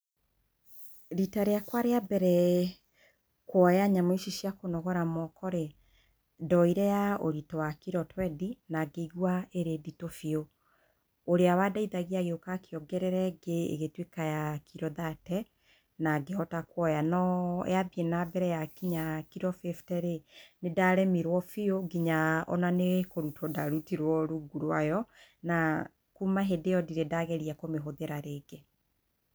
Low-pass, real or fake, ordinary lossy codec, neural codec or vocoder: none; real; none; none